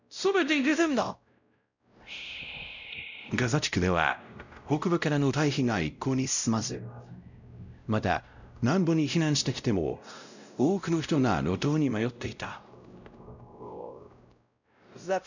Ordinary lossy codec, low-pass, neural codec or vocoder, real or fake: none; 7.2 kHz; codec, 16 kHz, 0.5 kbps, X-Codec, WavLM features, trained on Multilingual LibriSpeech; fake